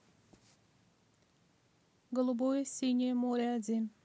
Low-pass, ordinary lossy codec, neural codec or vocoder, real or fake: none; none; none; real